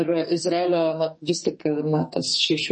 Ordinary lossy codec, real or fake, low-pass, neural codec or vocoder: MP3, 32 kbps; fake; 10.8 kHz; codec, 44.1 kHz, 2.6 kbps, SNAC